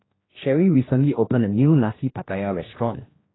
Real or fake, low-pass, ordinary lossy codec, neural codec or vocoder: fake; 7.2 kHz; AAC, 16 kbps; codec, 16 kHz, 1 kbps, X-Codec, HuBERT features, trained on general audio